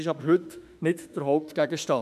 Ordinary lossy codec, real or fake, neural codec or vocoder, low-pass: none; fake; autoencoder, 48 kHz, 32 numbers a frame, DAC-VAE, trained on Japanese speech; 14.4 kHz